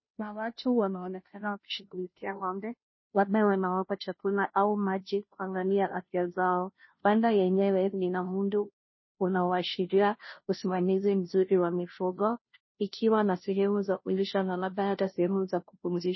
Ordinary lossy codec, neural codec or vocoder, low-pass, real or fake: MP3, 24 kbps; codec, 16 kHz, 0.5 kbps, FunCodec, trained on Chinese and English, 25 frames a second; 7.2 kHz; fake